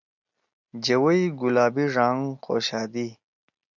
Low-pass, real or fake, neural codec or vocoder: 7.2 kHz; real; none